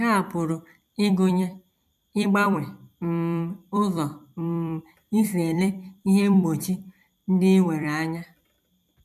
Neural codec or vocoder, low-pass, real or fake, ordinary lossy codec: vocoder, 44.1 kHz, 128 mel bands every 256 samples, BigVGAN v2; 14.4 kHz; fake; none